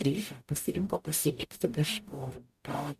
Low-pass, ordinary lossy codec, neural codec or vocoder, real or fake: 14.4 kHz; MP3, 96 kbps; codec, 44.1 kHz, 0.9 kbps, DAC; fake